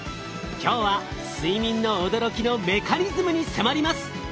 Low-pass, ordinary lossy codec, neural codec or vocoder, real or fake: none; none; none; real